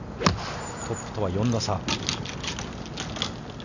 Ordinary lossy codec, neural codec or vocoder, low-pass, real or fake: none; none; 7.2 kHz; real